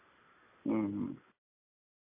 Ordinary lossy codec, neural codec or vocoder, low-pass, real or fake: MP3, 24 kbps; none; 3.6 kHz; real